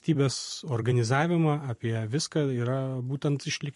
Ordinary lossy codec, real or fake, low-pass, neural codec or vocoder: MP3, 48 kbps; fake; 14.4 kHz; vocoder, 44.1 kHz, 128 mel bands every 256 samples, BigVGAN v2